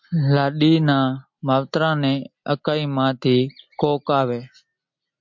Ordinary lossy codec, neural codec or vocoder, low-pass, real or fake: MP3, 48 kbps; none; 7.2 kHz; real